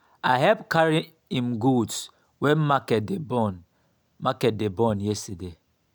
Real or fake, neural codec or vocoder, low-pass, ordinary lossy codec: real; none; none; none